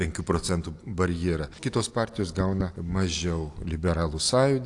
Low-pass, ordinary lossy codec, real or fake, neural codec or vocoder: 10.8 kHz; AAC, 64 kbps; real; none